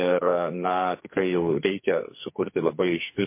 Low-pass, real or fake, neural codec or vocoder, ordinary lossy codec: 3.6 kHz; fake; codec, 44.1 kHz, 2.6 kbps, SNAC; MP3, 24 kbps